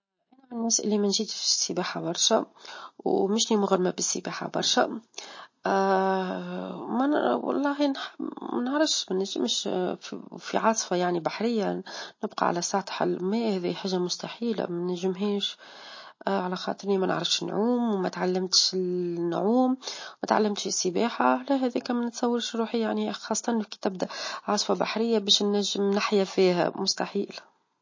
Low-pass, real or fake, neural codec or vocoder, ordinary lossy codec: 7.2 kHz; real; none; MP3, 32 kbps